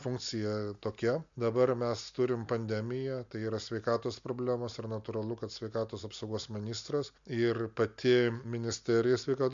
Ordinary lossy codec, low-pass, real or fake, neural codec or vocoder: MP3, 64 kbps; 7.2 kHz; real; none